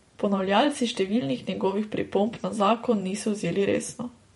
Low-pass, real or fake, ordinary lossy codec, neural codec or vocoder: 19.8 kHz; fake; MP3, 48 kbps; vocoder, 44.1 kHz, 128 mel bands every 512 samples, BigVGAN v2